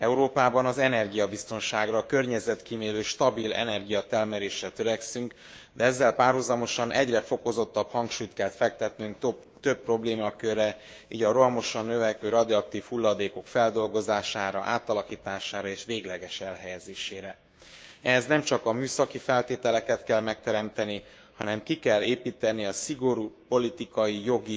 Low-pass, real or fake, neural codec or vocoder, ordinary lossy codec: none; fake; codec, 16 kHz, 6 kbps, DAC; none